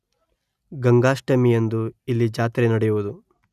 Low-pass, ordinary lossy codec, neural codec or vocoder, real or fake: 14.4 kHz; none; none; real